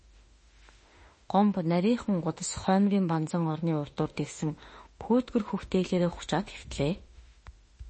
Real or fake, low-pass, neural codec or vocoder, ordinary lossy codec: fake; 10.8 kHz; autoencoder, 48 kHz, 32 numbers a frame, DAC-VAE, trained on Japanese speech; MP3, 32 kbps